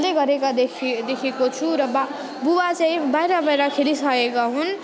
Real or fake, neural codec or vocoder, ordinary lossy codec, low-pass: real; none; none; none